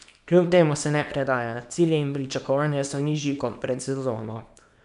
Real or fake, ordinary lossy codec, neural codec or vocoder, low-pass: fake; none; codec, 24 kHz, 0.9 kbps, WavTokenizer, small release; 10.8 kHz